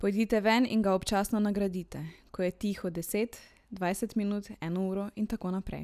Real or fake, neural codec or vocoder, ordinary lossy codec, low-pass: real; none; none; 14.4 kHz